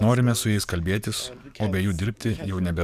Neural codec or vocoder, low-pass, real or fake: codec, 44.1 kHz, 7.8 kbps, DAC; 14.4 kHz; fake